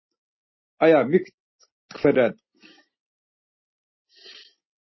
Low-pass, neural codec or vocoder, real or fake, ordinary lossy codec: 7.2 kHz; none; real; MP3, 24 kbps